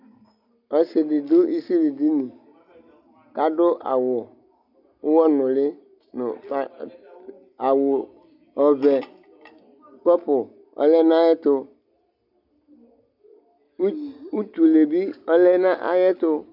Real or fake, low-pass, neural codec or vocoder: real; 5.4 kHz; none